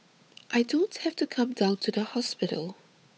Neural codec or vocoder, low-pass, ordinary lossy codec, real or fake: codec, 16 kHz, 8 kbps, FunCodec, trained on Chinese and English, 25 frames a second; none; none; fake